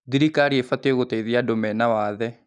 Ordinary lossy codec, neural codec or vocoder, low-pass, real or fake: none; none; 10.8 kHz; real